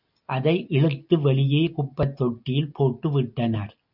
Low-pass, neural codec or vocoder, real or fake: 5.4 kHz; none; real